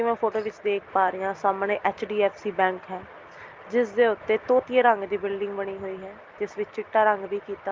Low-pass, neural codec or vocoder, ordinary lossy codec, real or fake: 7.2 kHz; none; Opus, 24 kbps; real